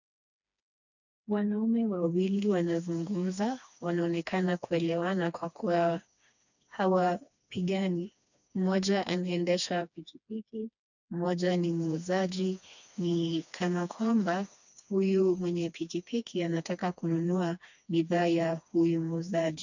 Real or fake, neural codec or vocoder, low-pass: fake; codec, 16 kHz, 2 kbps, FreqCodec, smaller model; 7.2 kHz